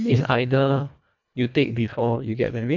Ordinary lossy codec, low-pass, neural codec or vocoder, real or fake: none; 7.2 kHz; codec, 24 kHz, 1.5 kbps, HILCodec; fake